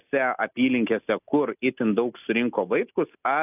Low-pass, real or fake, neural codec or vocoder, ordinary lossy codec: 3.6 kHz; real; none; AAC, 32 kbps